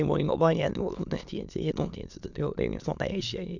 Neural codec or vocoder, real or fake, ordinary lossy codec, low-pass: autoencoder, 22.05 kHz, a latent of 192 numbers a frame, VITS, trained on many speakers; fake; none; 7.2 kHz